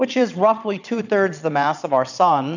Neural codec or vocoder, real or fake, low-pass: vocoder, 22.05 kHz, 80 mel bands, Vocos; fake; 7.2 kHz